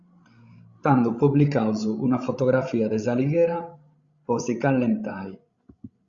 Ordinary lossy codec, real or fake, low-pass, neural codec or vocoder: Opus, 64 kbps; fake; 7.2 kHz; codec, 16 kHz, 16 kbps, FreqCodec, larger model